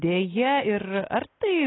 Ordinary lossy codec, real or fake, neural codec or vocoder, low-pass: AAC, 16 kbps; real; none; 7.2 kHz